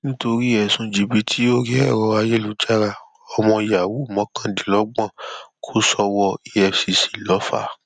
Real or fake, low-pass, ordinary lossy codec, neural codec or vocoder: real; 9.9 kHz; none; none